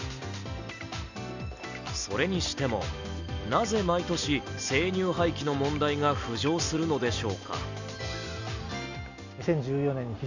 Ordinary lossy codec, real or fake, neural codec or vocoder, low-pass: none; real; none; 7.2 kHz